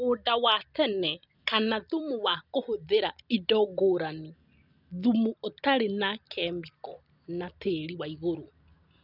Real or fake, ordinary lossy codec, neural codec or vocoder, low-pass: real; none; none; 5.4 kHz